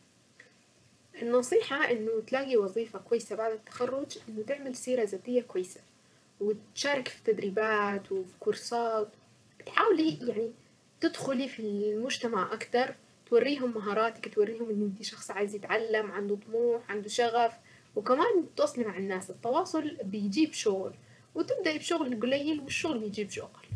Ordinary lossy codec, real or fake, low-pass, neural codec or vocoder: none; fake; none; vocoder, 22.05 kHz, 80 mel bands, WaveNeXt